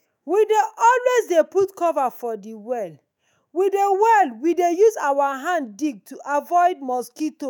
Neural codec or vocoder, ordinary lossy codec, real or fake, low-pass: autoencoder, 48 kHz, 128 numbers a frame, DAC-VAE, trained on Japanese speech; none; fake; none